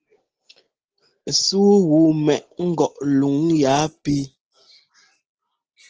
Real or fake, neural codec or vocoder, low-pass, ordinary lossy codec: real; none; 7.2 kHz; Opus, 16 kbps